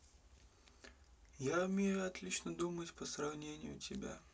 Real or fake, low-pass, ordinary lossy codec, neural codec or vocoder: real; none; none; none